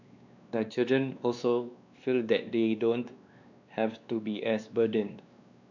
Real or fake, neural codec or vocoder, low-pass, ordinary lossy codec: fake; codec, 16 kHz, 2 kbps, X-Codec, WavLM features, trained on Multilingual LibriSpeech; 7.2 kHz; none